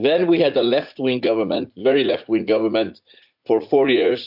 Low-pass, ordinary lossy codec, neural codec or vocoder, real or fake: 5.4 kHz; AAC, 48 kbps; vocoder, 44.1 kHz, 80 mel bands, Vocos; fake